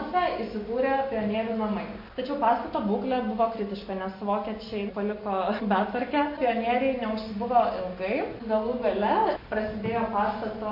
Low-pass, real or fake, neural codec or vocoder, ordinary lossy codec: 5.4 kHz; real; none; MP3, 32 kbps